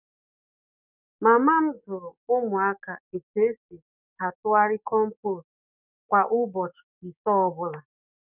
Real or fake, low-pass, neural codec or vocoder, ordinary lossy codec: fake; 3.6 kHz; codec, 44.1 kHz, 7.8 kbps, DAC; none